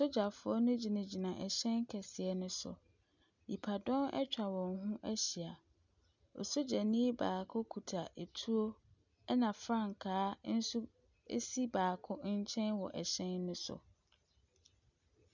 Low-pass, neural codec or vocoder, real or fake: 7.2 kHz; none; real